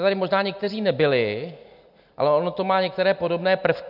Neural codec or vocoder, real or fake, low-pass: none; real; 5.4 kHz